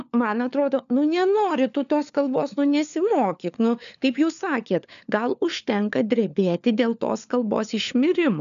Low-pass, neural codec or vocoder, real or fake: 7.2 kHz; codec, 16 kHz, 4 kbps, FunCodec, trained on LibriTTS, 50 frames a second; fake